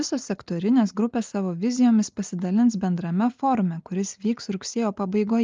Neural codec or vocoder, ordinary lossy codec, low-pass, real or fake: none; Opus, 32 kbps; 7.2 kHz; real